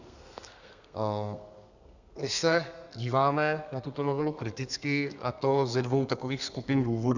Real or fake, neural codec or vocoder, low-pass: fake; codec, 32 kHz, 1.9 kbps, SNAC; 7.2 kHz